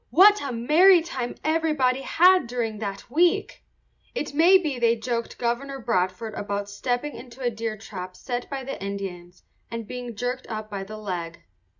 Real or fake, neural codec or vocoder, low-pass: real; none; 7.2 kHz